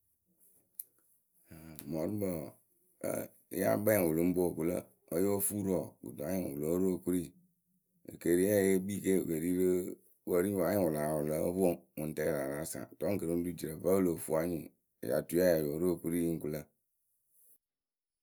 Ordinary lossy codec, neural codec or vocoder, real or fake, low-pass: none; none; real; none